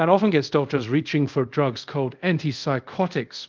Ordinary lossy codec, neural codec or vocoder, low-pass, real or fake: Opus, 24 kbps; codec, 24 kHz, 0.5 kbps, DualCodec; 7.2 kHz; fake